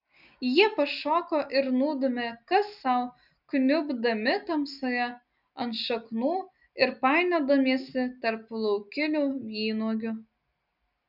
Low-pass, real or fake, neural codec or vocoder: 5.4 kHz; real; none